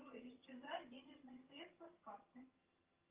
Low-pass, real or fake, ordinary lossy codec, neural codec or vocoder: 3.6 kHz; fake; Opus, 32 kbps; vocoder, 22.05 kHz, 80 mel bands, HiFi-GAN